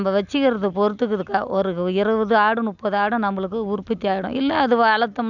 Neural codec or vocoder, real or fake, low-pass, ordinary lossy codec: none; real; 7.2 kHz; none